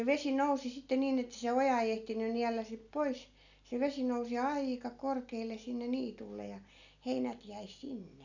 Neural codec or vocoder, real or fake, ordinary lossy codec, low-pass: none; real; none; 7.2 kHz